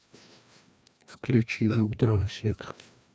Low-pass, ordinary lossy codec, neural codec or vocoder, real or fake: none; none; codec, 16 kHz, 1 kbps, FreqCodec, larger model; fake